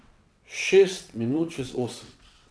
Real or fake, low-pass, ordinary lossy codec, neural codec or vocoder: fake; none; none; vocoder, 22.05 kHz, 80 mel bands, WaveNeXt